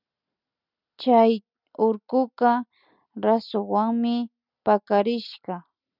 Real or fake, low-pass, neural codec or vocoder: real; 5.4 kHz; none